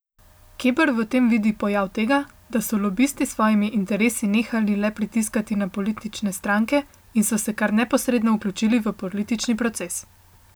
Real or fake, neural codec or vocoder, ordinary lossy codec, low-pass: real; none; none; none